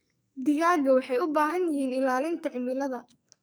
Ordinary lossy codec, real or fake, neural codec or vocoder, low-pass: none; fake; codec, 44.1 kHz, 2.6 kbps, SNAC; none